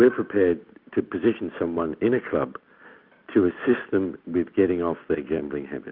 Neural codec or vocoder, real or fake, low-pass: none; real; 5.4 kHz